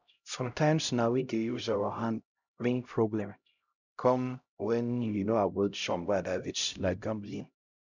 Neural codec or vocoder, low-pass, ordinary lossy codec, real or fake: codec, 16 kHz, 0.5 kbps, X-Codec, HuBERT features, trained on LibriSpeech; 7.2 kHz; none; fake